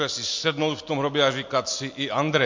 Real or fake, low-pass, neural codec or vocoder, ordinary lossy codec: real; 7.2 kHz; none; MP3, 48 kbps